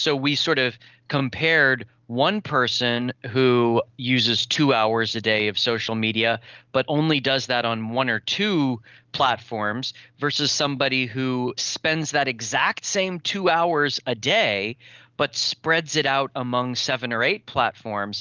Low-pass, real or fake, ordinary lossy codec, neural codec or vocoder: 7.2 kHz; real; Opus, 32 kbps; none